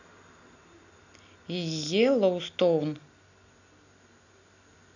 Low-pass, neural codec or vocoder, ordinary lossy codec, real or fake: 7.2 kHz; none; none; real